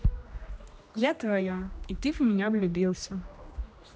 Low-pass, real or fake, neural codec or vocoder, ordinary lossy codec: none; fake; codec, 16 kHz, 1 kbps, X-Codec, HuBERT features, trained on general audio; none